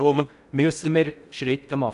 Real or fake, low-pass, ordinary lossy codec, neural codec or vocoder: fake; 10.8 kHz; none; codec, 16 kHz in and 24 kHz out, 0.6 kbps, FocalCodec, streaming, 4096 codes